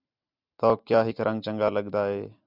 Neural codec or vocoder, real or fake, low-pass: none; real; 5.4 kHz